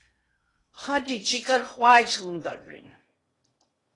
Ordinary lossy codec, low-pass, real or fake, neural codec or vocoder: AAC, 32 kbps; 10.8 kHz; fake; codec, 16 kHz in and 24 kHz out, 0.8 kbps, FocalCodec, streaming, 65536 codes